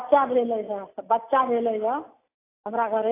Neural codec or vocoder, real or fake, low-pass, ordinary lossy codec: none; real; 3.6 kHz; AAC, 24 kbps